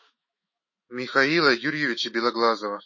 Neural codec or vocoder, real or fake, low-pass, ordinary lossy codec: autoencoder, 48 kHz, 128 numbers a frame, DAC-VAE, trained on Japanese speech; fake; 7.2 kHz; MP3, 32 kbps